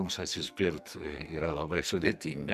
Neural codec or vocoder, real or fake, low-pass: codec, 44.1 kHz, 2.6 kbps, SNAC; fake; 14.4 kHz